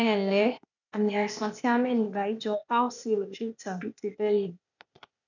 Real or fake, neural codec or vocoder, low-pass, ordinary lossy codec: fake; codec, 16 kHz, 0.8 kbps, ZipCodec; 7.2 kHz; none